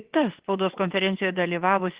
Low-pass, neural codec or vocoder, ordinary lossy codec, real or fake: 3.6 kHz; vocoder, 22.05 kHz, 80 mel bands, WaveNeXt; Opus, 16 kbps; fake